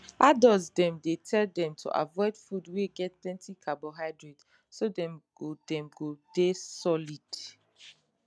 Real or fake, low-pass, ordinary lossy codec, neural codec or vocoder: real; none; none; none